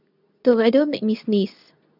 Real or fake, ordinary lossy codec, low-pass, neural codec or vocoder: fake; none; 5.4 kHz; codec, 24 kHz, 0.9 kbps, WavTokenizer, medium speech release version 2